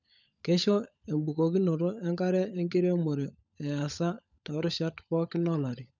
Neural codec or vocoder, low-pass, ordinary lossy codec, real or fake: codec, 16 kHz, 16 kbps, FunCodec, trained on LibriTTS, 50 frames a second; 7.2 kHz; none; fake